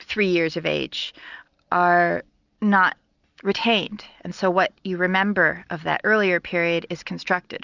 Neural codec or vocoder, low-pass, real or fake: none; 7.2 kHz; real